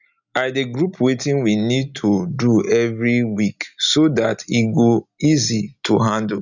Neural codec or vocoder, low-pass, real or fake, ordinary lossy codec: none; 7.2 kHz; real; none